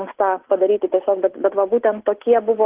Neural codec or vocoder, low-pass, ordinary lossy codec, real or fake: none; 3.6 kHz; Opus, 16 kbps; real